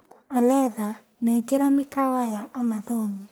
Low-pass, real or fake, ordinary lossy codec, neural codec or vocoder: none; fake; none; codec, 44.1 kHz, 1.7 kbps, Pupu-Codec